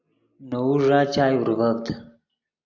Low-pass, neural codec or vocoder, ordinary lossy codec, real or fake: 7.2 kHz; none; AAC, 48 kbps; real